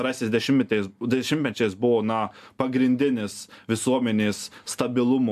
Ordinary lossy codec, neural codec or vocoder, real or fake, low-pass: AAC, 96 kbps; none; real; 14.4 kHz